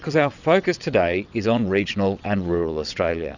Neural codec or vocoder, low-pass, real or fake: vocoder, 22.05 kHz, 80 mel bands, WaveNeXt; 7.2 kHz; fake